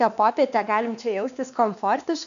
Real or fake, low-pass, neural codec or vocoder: fake; 7.2 kHz; codec, 16 kHz, 2 kbps, X-Codec, WavLM features, trained on Multilingual LibriSpeech